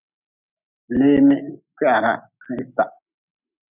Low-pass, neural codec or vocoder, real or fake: 3.6 kHz; none; real